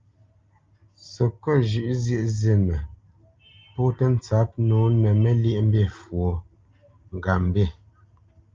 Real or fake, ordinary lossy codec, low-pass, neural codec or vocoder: real; Opus, 24 kbps; 7.2 kHz; none